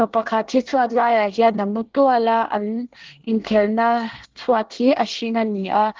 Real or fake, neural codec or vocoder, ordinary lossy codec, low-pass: fake; codec, 24 kHz, 1 kbps, SNAC; Opus, 16 kbps; 7.2 kHz